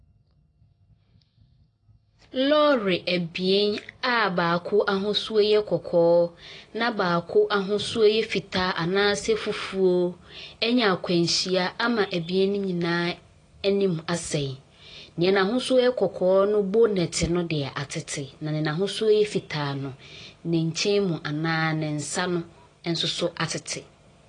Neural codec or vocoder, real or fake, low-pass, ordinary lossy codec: none; real; 9.9 kHz; AAC, 32 kbps